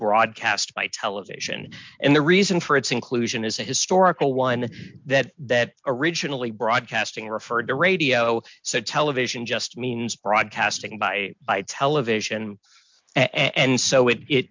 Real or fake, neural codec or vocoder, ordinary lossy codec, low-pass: real; none; MP3, 64 kbps; 7.2 kHz